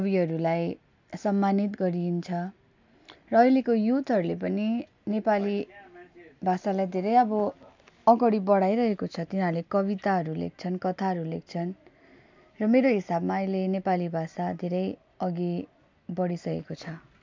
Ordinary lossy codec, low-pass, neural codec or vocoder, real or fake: MP3, 64 kbps; 7.2 kHz; none; real